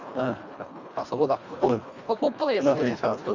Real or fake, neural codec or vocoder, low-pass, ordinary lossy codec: fake; codec, 24 kHz, 1.5 kbps, HILCodec; 7.2 kHz; Opus, 64 kbps